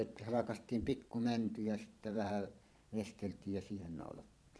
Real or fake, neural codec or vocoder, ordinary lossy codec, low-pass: fake; vocoder, 22.05 kHz, 80 mel bands, Vocos; none; none